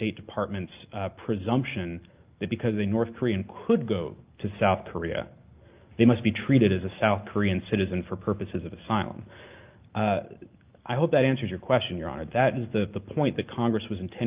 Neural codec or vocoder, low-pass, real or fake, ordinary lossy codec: none; 3.6 kHz; real; Opus, 32 kbps